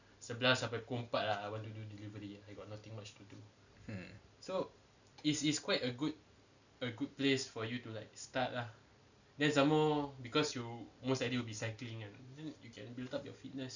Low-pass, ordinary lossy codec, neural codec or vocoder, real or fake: 7.2 kHz; none; none; real